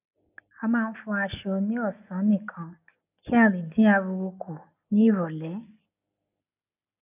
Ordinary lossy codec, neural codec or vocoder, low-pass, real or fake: none; none; 3.6 kHz; real